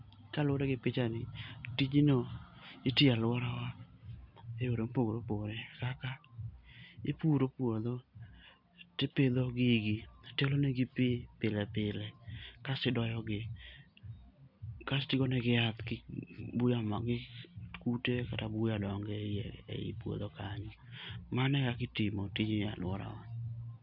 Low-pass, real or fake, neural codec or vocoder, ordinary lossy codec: 5.4 kHz; real; none; none